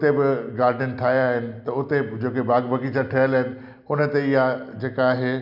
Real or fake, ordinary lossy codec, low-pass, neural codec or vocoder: real; none; 5.4 kHz; none